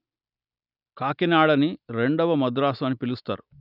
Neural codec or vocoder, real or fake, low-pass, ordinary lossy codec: none; real; 5.4 kHz; none